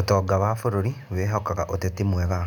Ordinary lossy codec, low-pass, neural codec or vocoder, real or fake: none; 19.8 kHz; none; real